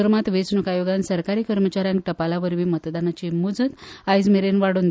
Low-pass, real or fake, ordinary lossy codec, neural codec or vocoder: none; real; none; none